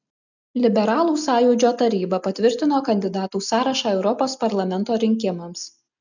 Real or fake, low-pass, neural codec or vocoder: real; 7.2 kHz; none